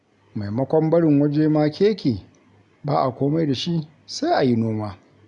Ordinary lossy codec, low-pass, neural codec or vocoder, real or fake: none; 10.8 kHz; none; real